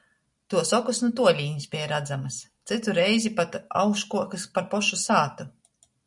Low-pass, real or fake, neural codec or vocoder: 10.8 kHz; real; none